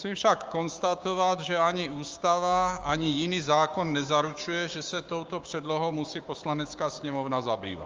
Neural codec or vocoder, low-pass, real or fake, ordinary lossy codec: codec, 16 kHz, 6 kbps, DAC; 7.2 kHz; fake; Opus, 32 kbps